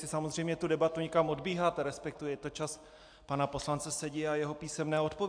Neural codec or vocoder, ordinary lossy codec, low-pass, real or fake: none; AAC, 64 kbps; 9.9 kHz; real